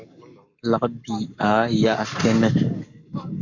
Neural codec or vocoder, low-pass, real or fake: codec, 44.1 kHz, 7.8 kbps, Pupu-Codec; 7.2 kHz; fake